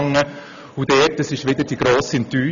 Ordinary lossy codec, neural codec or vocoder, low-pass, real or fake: none; none; 7.2 kHz; real